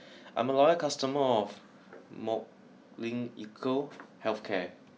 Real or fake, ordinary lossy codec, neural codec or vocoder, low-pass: real; none; none; none